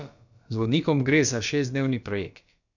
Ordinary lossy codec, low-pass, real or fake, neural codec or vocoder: none; 7.2 kHz; fake; codec, 16 kHz, about 1 kbps, DyCAST, with the encoder's durations